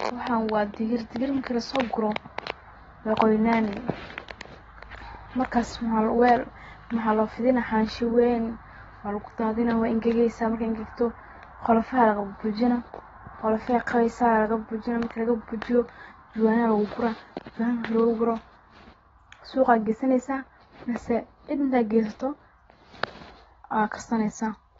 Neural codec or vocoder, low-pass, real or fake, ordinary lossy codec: none; 7.2 kHz; real; AAC, 24 kbps